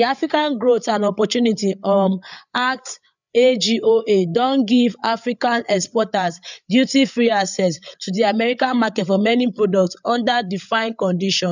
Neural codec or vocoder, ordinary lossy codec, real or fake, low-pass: codec, 16 kHz, 8 kbps, FreqCodec, larger model; none; fake; 7.2 kHz